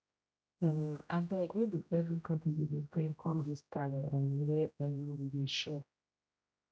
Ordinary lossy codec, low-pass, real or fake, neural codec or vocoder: none; none; fake; codec, 16 kHz, 0.5 kbps, X-Codec, HuBERT features, trained on general audio